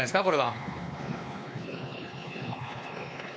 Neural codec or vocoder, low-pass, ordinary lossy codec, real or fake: codec, 16 kHz, 2 kbps, X-Codec, WavLM features, trained on Multilingual LibriSpeech; none; none; fake